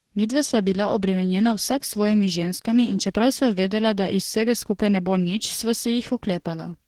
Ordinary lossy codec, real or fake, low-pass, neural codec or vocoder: Opus, 16 kbps; fake; 19.8 kHz; codec, 44.1 kHz, 2.6 kbps, DAC